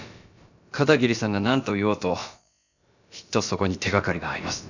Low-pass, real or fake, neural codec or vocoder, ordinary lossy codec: 7.2 kHz; fake; codec, 16 kHz, about 1 kbps, DyCAST, with the encoder's durations; none